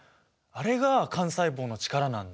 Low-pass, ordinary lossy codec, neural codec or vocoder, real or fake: none; none; none; real